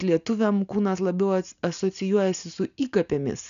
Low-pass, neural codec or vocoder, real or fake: 7.2 kHz; none; real